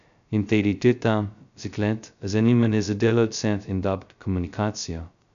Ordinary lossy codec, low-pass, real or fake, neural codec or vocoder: none; 7.2 kHz; fake; codec, 16 kHz, 0.2 kbps, FocalCodec